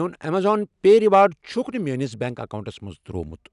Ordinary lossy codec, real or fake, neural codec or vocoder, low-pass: none; real; none; 10.8 kHz